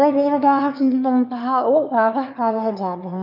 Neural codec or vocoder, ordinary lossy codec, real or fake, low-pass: autoencoder, 22.05 kHz, a latent of 192 numbers a frame, VITS, trained on one speaker; none; fake; 5.4 kHz